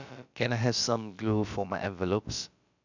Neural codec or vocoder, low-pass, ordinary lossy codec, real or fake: codec, 16 kHz, about 1 kbps, DyCAST, with the encoder's durations; 7.2 kHz; none; fake